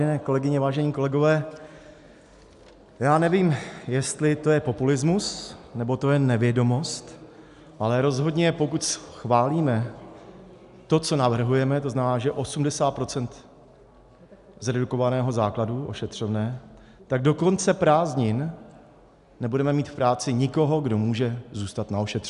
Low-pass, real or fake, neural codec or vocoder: 10.8 kHz; real; none